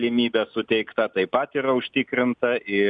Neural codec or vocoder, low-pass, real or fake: none; 9.9 kHz; real